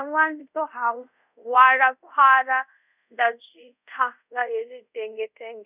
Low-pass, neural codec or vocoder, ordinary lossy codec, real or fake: 3.6 kHz; codec, 24 kHz, 0.5 kbps, DualCodec; none; fake